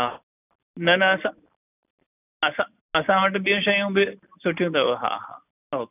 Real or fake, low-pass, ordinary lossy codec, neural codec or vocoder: real; 3.6 kHz; none; none